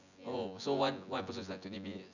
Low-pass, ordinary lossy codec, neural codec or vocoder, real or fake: 7.2 kHz; none; vocoder, 24 kHz, 100 mel bands, Vocos; fake